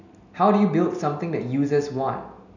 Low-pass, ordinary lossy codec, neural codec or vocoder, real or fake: 7.2 kHz; none; none; real